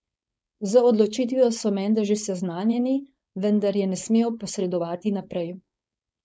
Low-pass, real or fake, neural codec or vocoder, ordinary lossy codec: none; fake; codec, 16 kHz, 4.8 kbps, FACodec; none